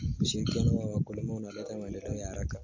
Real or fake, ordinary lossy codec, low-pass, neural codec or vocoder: real; MP3, 48 kbps; 7.2 kHz; none